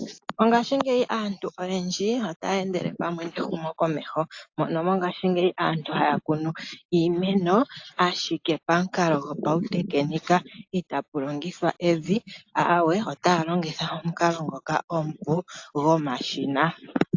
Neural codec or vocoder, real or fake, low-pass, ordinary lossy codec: none; real; 7.2 kHz; AAC, 48 kbps